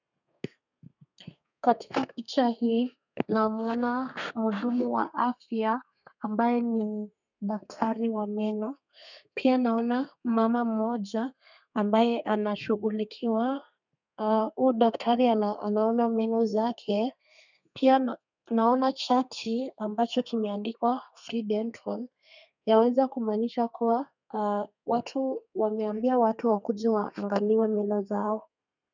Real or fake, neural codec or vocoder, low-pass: fake; codec, 32 kHz, 1.9 kbps, SNAC; 7.2 kHz